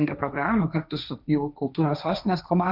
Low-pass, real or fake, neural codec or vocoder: 5.4 kHz; fake; codec, 16 kHz, 1.1 kbps, Voila-Tokenizer